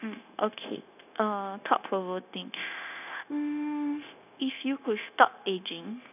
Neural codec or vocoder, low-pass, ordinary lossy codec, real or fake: codec, 16 kHz, 0.9 kbps, LongCat-Audio-Codec; 3.6 kHz; none; fake